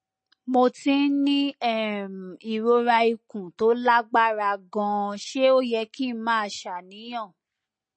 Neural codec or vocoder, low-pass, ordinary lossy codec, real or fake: none; 9.9 kHz; MP3, 32 kbps; real